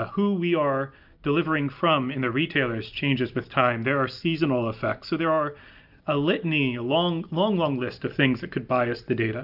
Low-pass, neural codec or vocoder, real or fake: 5.4 kHz; none; real